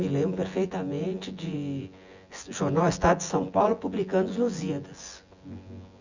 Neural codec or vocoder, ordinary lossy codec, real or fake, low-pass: vocoder, 24 kHz, 100 mel bands, Vocos; Opus, 64 kbps; fake; 7.2 kHz